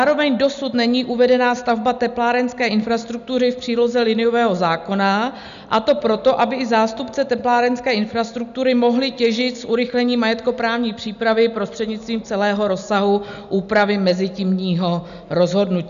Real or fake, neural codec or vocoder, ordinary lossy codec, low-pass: real; none; MP3, 96 kbps; 7.2 kHz